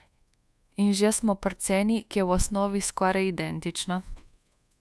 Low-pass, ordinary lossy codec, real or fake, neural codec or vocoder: none; none; fake; codec, 24 kHz, 1.2 kbps, DualCodec